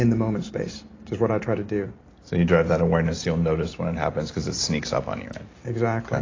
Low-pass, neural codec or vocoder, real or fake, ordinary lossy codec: 7.2 kHz; none; real; AAC, 32 kbps